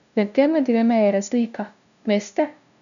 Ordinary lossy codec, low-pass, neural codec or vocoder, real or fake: none; 7.2 kHz; codec, 16 kHz, 0.5 kbps, FunCodec, trained on LibriTTS, 25 frames a second; fake